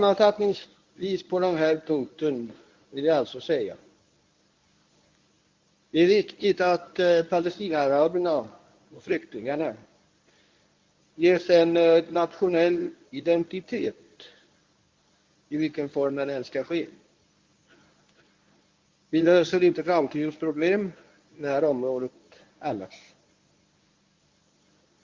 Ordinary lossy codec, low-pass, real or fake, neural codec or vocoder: Opus, 24 kbps; 7.2 kHz; fake; codec, 24 kHz, 0.9 kbps, WavTokenizer, medium speech release version 1